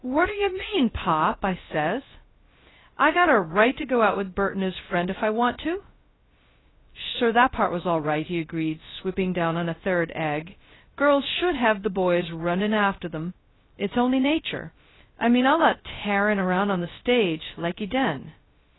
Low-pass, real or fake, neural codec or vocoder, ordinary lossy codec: 7.2 kHz; fake; codec, 16 kHz, 0.2 kbps, FocalCodec; AAC, 16 kbps